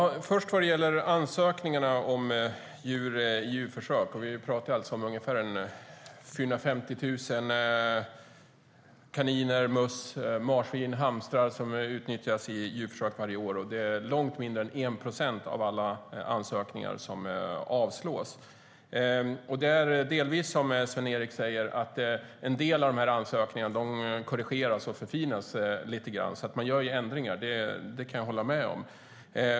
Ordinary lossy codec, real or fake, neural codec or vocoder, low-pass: none; real; none; none